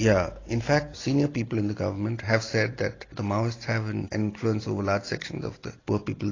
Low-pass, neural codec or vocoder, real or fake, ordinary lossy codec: 7.2 kHz; none; real; AAC, 32 kbps